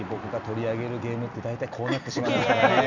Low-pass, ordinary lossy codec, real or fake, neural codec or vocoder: 7.2 kHz; none; real; none